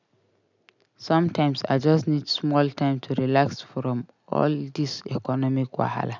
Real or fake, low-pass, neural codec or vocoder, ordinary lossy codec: real; 7.2 kHz; none; none